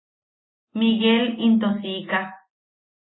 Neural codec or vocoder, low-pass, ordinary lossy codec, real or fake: none; 7.2 kHz; AAC, 16 kbps; real